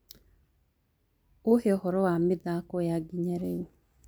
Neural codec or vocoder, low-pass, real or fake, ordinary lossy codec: none; none; real; none